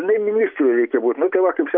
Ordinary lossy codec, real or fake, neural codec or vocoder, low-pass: AAC, 48 kbps; real; none; 5.4 kHz